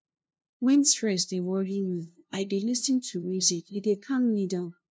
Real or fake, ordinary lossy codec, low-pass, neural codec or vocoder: fake; none; none; codec, 16 kHz, 0.5 kbps, FunCodec, trained on LibriTTS, 25 frames a second